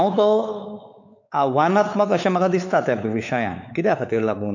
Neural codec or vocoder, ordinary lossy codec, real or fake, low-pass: codec, 16 kHz, 4 kbps, X-Codec, WavLM features, trained on Multilingual LibriSpeech; none; fake; 7.2 kHz